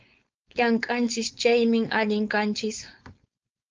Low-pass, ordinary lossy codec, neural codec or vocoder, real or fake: 7.2 kHz; Opus, 24 kbps; codec, 16 kHz, 4.8 kbps, FACodec; fake